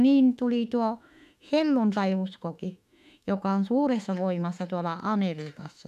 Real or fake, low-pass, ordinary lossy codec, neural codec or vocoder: fake; 14.4 kHz; none; autoencoder, 48 kHz, 32 numbers a frame, DAC-VAE, trained on Japanese speech